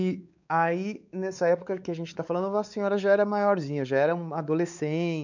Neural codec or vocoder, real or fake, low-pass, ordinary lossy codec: codec, 16 kHz, 4 kbps, X-Codec, WavLM features, trained on Multilingual LibriSpeech; fake; 7.2 kHz; none